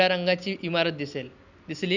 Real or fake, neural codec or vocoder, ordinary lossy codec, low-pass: real; none; none; 7.2 kHz